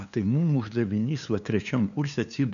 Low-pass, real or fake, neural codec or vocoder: 7.2 kHz; fake; codec, 16 kHz, 2 kbps, FunCodec, trained on LibriTTS, 25 frames a second